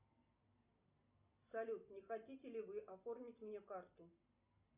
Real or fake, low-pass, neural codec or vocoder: real; 3.6 kHz; none